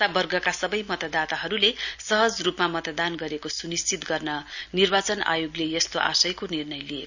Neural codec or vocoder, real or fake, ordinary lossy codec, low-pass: none; real; none; 7.2 kHz